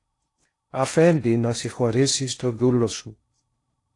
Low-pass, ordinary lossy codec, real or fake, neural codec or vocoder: 10.8 kHz; AAC, 48 kbps; fake; codec, 16 kHz in and 24 kHz out, 0.6 kbps, FocalCodec, streaming, 4096 codes